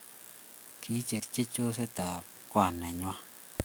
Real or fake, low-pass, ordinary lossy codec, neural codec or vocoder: fake; none; none; codec, 44.1 kHz, 7.8 kbps, DAC